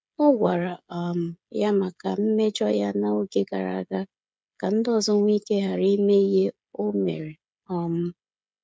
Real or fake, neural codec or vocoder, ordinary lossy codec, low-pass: fake; codec, 16 kHz, 16 kbps, FreqCodec, smaller model; none; none